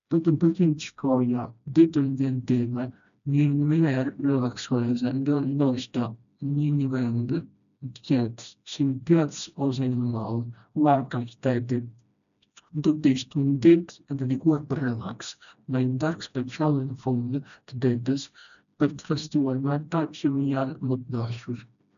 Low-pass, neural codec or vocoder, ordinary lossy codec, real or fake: 7.2 kHz; codec, 16 kHz, 1 kbps, FreqCodec, smaller model; none; fake